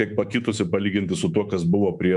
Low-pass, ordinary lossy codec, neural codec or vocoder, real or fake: 10.8 kHz; MP3, 96 kbps; codec, 24 kHz, 3.1 kbps, DualCodec; fake